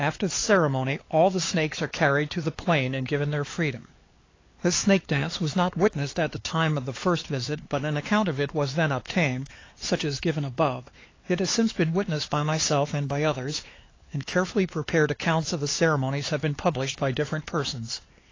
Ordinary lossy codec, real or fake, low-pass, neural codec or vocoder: AAC, 32 kbps; fake; 7.2 kHz; codec, 16 kHz, 4 kbps, X-Codec, HuBERT features, trained on LibriSpeech